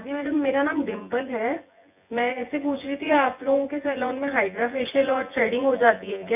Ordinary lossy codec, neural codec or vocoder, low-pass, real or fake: none; vocoder, 24 kHz, 100 mel bands, Vocos; 3.6 kHz; fake